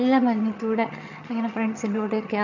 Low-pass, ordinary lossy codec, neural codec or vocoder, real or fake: 7.2 kHz; none; vocoder, 22.05 kHz, 80 mel bands, HiFi-GAN; fake